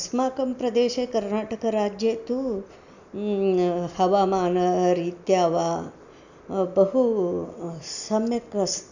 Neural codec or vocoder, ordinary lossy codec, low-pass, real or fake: none; none; 7.2 kHz; real